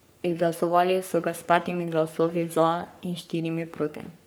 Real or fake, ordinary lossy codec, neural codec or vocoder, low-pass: fake; none; codec, 44.1 kHz, 3.4 kbps, Pupu-Codec; none